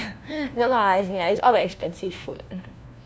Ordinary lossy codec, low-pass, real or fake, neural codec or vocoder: none; none; fake; codec, 16 kHz, 1 kbps, FunCodec, trained on LibriTTS, 50 frames a second